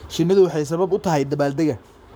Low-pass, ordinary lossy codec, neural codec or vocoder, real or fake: none; none; codec, 44.1 kHz, 7.8 kbps, Pupu-Codec; fake